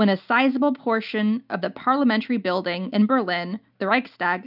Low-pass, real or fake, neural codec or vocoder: 5.4 kHz; real; none